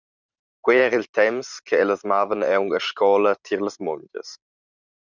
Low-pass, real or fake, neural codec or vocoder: 7.2 kHz; fake; vocoder, 44.1 kHz, 128 mel bands every 512 samples, BigVGAN v2